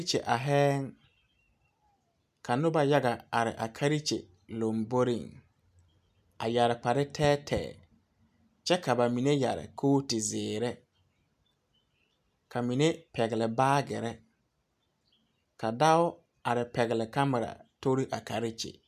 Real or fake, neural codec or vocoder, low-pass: real; none; 14.4 kHz